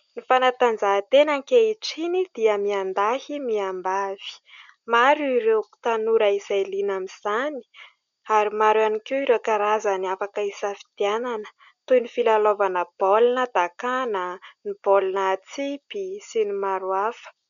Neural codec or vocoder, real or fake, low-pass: none; real; 7.2 kHz